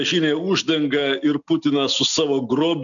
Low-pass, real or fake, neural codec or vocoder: 7.2 kHz; real; none